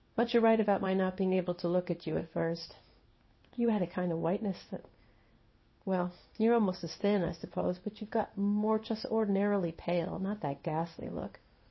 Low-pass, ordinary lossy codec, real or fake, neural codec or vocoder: 7.2 kHz; MP3, 24 kbps; fake; codec, 16 kHz in and 24 kHz out, 1 kbps, XY-Tokenizer